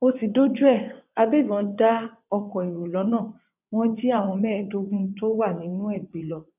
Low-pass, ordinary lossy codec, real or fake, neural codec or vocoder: 3.6 kHz; none; fake; vocoder, 22.05 kHz, 80 mel bands, WaveNeXt